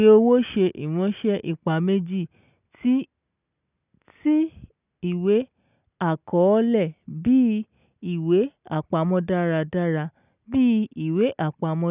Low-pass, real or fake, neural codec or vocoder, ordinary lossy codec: 3.6 kHz; real; none; none